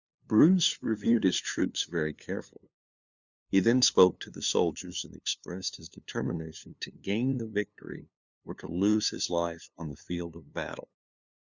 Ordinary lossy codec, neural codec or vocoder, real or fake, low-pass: Opus, 64 kbps; codec, 16 kHz, 2 kbps, FunCodec, trained on LibriTTS, 25 frames a second; fake; 7.2 kHz